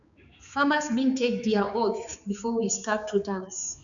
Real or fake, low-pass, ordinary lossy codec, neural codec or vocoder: fake; 7.2 kHz; none; codec, 16 kHz, 4 kbps, X-Codec, HuBERT features, trained on balanced general audio